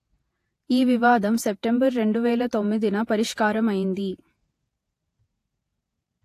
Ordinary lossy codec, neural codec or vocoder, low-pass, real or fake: AAC, 64 kbps; vocoder, 48 kHz, 128 mel bands, Vocos; 14.4 kHz; fake